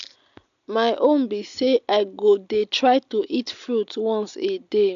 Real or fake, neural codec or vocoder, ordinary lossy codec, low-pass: real; none; AAC, 96 kbps; 7.2 kHz